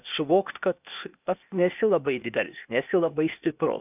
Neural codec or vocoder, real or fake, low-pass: codec, 16 kHz, 0.8 kbps, ZipCodec; fake; 3.6 kHz